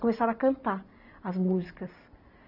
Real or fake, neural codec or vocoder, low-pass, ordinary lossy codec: real; none; 5.4 kHz; none